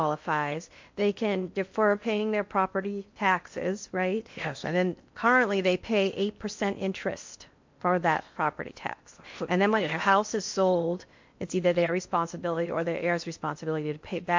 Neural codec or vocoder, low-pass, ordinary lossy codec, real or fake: codec, 16 kHz in and 24 kHz out, 0.8 kbps, FocalCodec, streaming, 65536 codes; 7.2 kHz; MP3, 48 kbps; fake